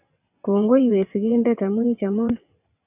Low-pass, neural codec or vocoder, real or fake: 3.6 kHz; vocoder, 22.05 kHz, 80 mel bands, WaveNeXt; fake